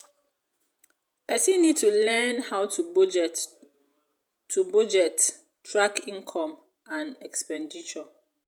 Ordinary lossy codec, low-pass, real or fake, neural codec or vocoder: none; none; fake; vocoder, 48 kHz, 128 mel bands, Vocos